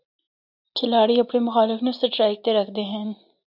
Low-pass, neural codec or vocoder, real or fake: 5.4 kHz; none; real